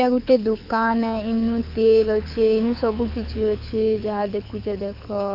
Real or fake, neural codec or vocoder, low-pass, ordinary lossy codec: fake; codec, 24 kHz, 6 kbps, HILCodec; 5.4 kHz; AAC, 48 kbps